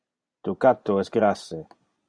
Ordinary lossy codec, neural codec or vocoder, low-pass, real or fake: MP3, 96 kbps; none; 9.9 kHz; real